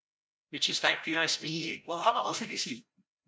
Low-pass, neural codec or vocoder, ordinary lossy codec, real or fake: none; codec, 16 kHz, 0.5 kbps, FreqCodec, larger model; none; fake